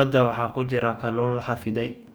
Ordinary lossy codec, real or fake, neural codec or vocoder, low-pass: none; fake; codec, 44.1 kHz, 2.6 kbps, DAC; none